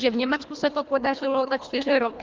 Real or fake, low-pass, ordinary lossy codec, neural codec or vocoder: fake; 7.2 kHz; Opus, 24 kbps; codec, 24 kHz, 1.5 kbps, HILCodec